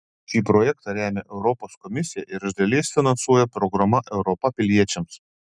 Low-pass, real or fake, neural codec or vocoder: 9.9 kHz; real; none